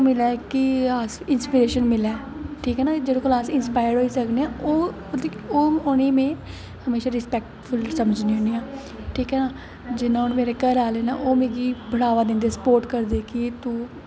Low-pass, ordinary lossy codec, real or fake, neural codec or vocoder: none; none; real; none